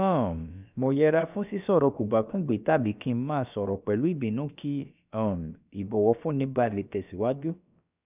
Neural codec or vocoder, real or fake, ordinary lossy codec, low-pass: codec, 16 kHz, about 1 kbps, DyCAST, with the encoder's durations; fake; none; 3.6 kHz